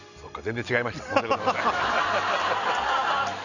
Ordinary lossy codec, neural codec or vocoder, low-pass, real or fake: none; none; 7.2 kHz; real